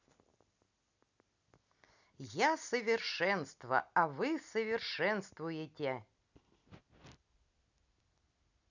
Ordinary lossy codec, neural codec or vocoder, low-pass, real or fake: none; none; 7.2 kHz; real